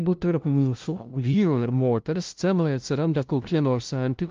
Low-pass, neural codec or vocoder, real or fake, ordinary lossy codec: 7.2 kHz; codec, 16 kHz, 0.5 kbps, FunCodec, trained on LibriTTS, 25 frames a second; fake; Opus, 24 kbps